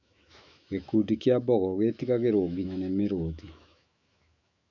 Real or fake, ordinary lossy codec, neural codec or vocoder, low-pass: fake; none; autoencoder, 48 kHz, 128 numbers a frame, DAC-VAE, trained on Japanese speech; 7.2 kHz